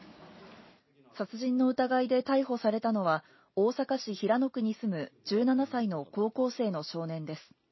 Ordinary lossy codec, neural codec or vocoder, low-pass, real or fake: MP3, 24 kbps; none; 7.2 kHz; real